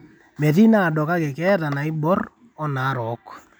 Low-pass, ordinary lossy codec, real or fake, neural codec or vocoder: none; none; real; none